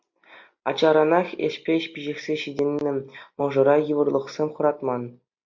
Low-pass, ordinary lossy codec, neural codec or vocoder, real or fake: 7.2 kHz; MP3, 64 kbps; none; real